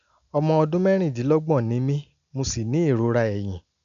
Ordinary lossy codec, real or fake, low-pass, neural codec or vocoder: none; real; 7.2 kHz; none